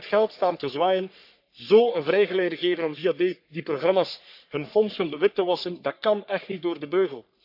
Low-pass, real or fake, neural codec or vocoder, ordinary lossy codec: 5.4 kHz; fake; codec, 44.1 kHz, 3.4 kbps, Pupu-Codec; none